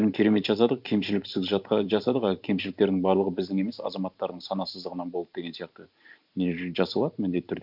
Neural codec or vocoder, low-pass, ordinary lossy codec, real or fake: vocoder, 44.1 kHz, 128 mel bands every 512 samples, BigVGAN v2; 5.4 kHz; none; fake